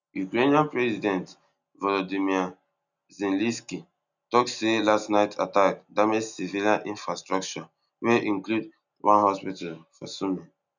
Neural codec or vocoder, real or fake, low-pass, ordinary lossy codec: none; real; 7.2 kHz; none